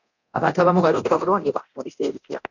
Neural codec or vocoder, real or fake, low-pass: codec, 24 kHz, 0.9 kbps, DualCodec; fake; 7.2 kHz